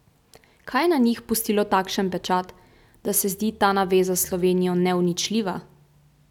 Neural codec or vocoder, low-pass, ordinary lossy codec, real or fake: none; 19.8 kHz; none; real